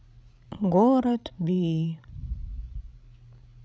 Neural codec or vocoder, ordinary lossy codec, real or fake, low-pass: codec, 16 kHz, 16 kbps, FreqCodec, larger model; none; fake; none